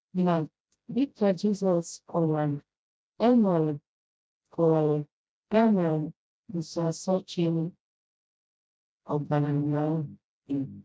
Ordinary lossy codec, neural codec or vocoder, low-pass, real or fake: none; codec, 16 kHz, 0.5 kbps, FreqCodec, smaller model; none; fake